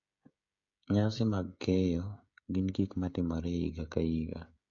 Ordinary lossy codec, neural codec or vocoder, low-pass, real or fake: MP3, 48 kbps; codec, 16 kHz, 16 kbps, FreqCodec, smaller model; 7.2 kHz; fake